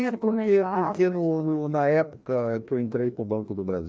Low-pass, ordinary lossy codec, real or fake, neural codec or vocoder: none; none; fake; codec, 16 kHz, 1 kbps, FreqCodec, larger model